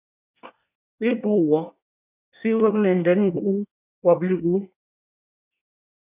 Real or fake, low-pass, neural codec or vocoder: fake; 3.6 kHz; codec, 24 kHz, 1 kbps, SNAC